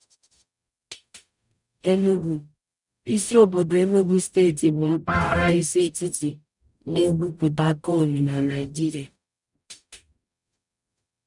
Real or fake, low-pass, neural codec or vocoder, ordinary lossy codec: fake; 10.8 kHz; codec, 44.1 kHz, 0.9 kbps, DAC; none